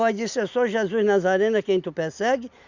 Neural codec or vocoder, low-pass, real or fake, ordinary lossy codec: none; 7.2 kHz; real; Opus, 64 kbps